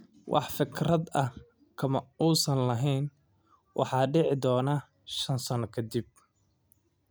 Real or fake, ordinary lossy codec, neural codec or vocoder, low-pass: real; none; none; none